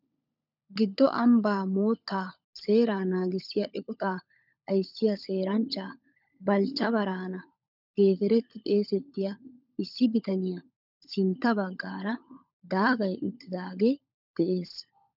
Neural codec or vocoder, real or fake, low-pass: codec, 16 kHz, 16 kbps, FunCodec, trained on LibriTTS, 50 frames a second; fake; 5.4 kHz